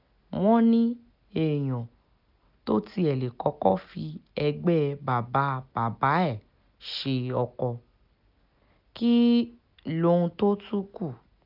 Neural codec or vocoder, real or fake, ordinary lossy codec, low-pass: none; real; none; 5.4 kHz